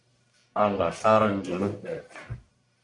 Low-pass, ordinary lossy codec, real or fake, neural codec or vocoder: 10.8 kHz; AAC, 64 kbps; fake; codec, 44.1 kHz, 1.7 kbps, Pupu-Codec